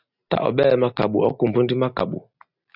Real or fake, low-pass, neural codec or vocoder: real; 5.4 kHz; none